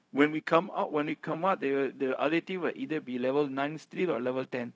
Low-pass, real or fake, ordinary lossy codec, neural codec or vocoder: none; fake; none; codec, 16 kHz, 0.4 kbps, LongCat-Audio-Codec